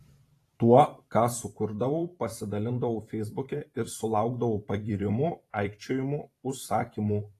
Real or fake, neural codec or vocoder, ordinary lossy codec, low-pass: fake; vocoder, 44.1 kHz, 128 mel bands every 512 samples, BigVGAN v2; AAC, 48 kbps; 14.4 kHz